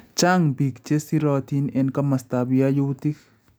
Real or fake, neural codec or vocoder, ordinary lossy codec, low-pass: real; none; none; none